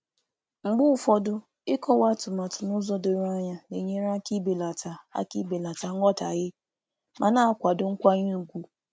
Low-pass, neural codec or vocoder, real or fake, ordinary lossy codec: none; none; real; none